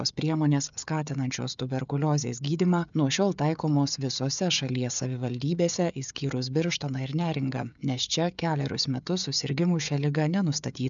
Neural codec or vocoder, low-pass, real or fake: codec, 16 kHz, 16 kbps, FreqCodec, smaller model; 7.2 kHz; fake